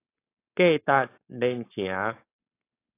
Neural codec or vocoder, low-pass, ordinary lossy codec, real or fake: codec, 16 kHz, 4.8 kbps, FACodec; 3.6 kHz; AAC, 24 kbps; fake